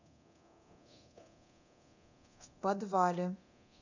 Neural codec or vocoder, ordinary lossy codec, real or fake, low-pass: codec, 24 kHz, 0.9 kbps, DualCodec; none; fake; 7.2 kHz